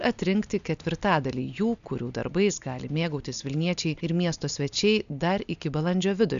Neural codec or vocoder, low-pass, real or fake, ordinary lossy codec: none; 7.2 kHz; real; MP3, 96 kbps